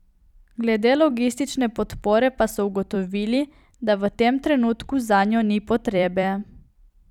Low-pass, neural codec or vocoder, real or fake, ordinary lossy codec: 19.8 kHz; vocoder, 44.1 kHz, 128 mel bands every 256 samples, BigVGAN v2; fake; none